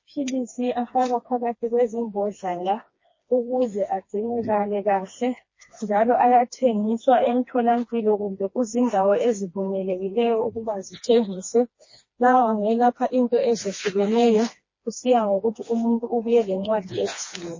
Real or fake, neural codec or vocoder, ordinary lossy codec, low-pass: fake; codec, 16 kHz, 2 kbps, FreqCodec, smaller model; MP3, 32 kbps; 7.2 kHz